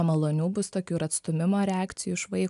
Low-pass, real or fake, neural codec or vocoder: 10.8 kHz; real; none